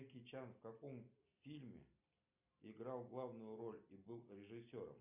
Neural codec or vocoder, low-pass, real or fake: none; 3.6 kHz; real